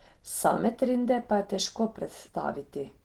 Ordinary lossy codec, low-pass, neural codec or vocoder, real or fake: Opus, 16 kbps; 19.8 kHz; none; real